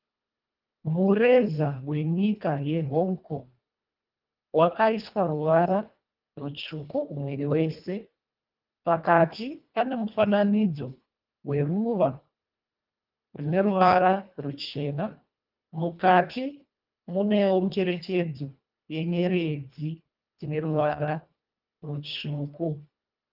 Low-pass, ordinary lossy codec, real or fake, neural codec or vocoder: 5.4 kHz; Opus, 24 kbps; fake; codec, 24 kHz, 1.5 kbps, HILCodec